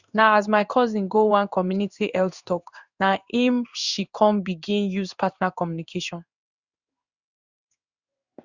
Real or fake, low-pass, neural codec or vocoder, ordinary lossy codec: fake; 7.2 kHz; codec, 16 kHz in and 24 kHz out, 1 kbps, XY-Tokenizer; Opus, 64 kbps